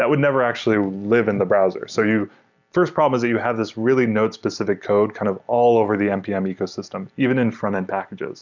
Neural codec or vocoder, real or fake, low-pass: none; real; 7.2 kHz